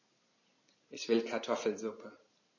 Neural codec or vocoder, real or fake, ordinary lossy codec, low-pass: none; real; MP3, 32 kbps; 7.2 kHz